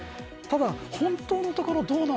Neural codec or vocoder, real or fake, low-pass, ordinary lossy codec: none; real; none; none